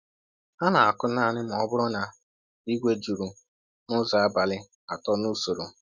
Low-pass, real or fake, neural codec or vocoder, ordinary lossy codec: 7.2 kHz; real; none; Opus, 64 kbps